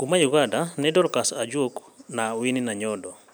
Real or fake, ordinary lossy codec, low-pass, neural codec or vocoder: real; none; none; none